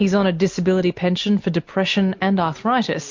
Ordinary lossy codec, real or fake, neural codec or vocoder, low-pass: MP3, 48 kbps; real; none; 7.2 kHz